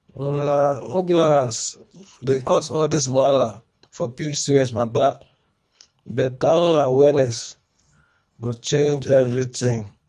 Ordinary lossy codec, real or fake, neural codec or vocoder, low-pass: none; fake; codec, 24 kHz, 1.5 kbps, HILCodec; none